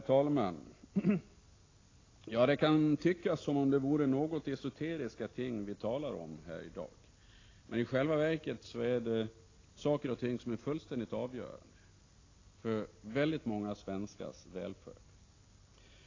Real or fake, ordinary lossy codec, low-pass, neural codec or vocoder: real; AAC, 32 kbps; 7.2 kHz; none